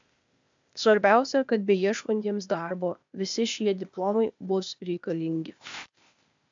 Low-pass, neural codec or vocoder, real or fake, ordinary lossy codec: 7.2 kHz; codec, 16 kHz, 0.8 kbps, ZipCodec; fake; MP3, 64 kbps